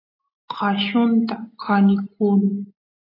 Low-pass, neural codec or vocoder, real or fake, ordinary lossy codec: 5.4 kHz; none; real; AAC, 32 kbps